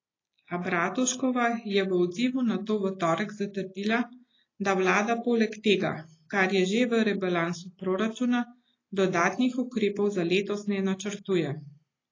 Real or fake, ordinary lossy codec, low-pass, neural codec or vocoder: real; AAC, 32 kbps; 7.2 kHz; none